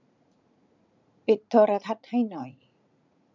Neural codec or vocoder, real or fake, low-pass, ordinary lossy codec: vocoder, 44.1 kHz, 80 mel bands, Vocos; fake; 7.2 kHz; none